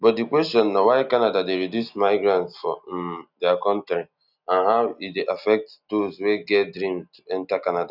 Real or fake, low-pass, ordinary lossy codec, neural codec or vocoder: real; 5.4 kHz; none; none